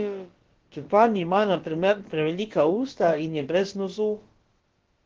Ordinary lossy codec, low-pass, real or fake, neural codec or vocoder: Opus, 16 kbps; 7.2 kHz; fake; codec, 16 kHz, about 1 kbps, DyCAST, with the encoder's durations